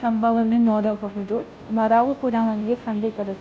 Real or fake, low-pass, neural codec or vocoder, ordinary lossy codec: fake; none; codec, 16 kHz, 0.5 kbps, FunCodec, trained on Chinese and English, 25 frames a second; none